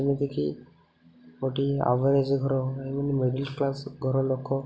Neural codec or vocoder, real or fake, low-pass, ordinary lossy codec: none; real; none; none